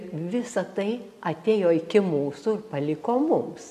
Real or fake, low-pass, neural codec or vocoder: real; 14.4 kHz; none